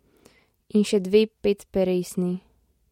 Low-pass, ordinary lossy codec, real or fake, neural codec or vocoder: 19.8 kHz; MP3, 64 kbps; real; none